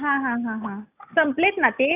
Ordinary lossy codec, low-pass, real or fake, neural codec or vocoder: none; 3.6 kHz; real; none